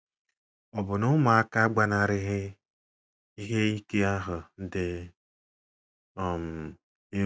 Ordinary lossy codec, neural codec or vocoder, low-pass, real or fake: none; none; none; real